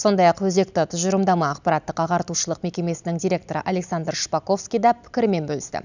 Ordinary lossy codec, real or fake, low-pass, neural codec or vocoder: none; real; 7.2 kHz; none